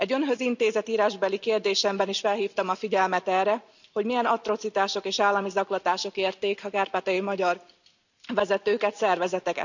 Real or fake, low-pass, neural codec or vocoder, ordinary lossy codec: real; 7.2 kHz; none; none